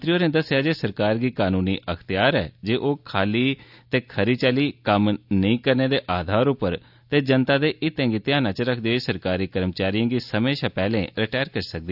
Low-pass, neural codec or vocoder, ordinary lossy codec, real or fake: 5.4 kHz; none; none; real